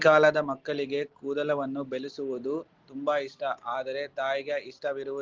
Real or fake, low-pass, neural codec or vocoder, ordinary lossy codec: real; 7.2 kHz; none; Opus, 16 kbps